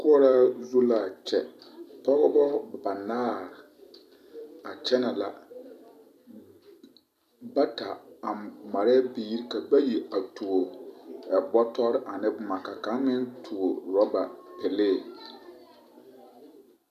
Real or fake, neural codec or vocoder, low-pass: fake; vocoder, 44.1 kHz, 128 mel bands every 256 samples, BigVGAN v2; 14.4 kHz